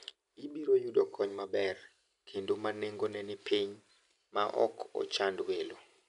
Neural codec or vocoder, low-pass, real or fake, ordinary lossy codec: none; 10.8 kHz; real; none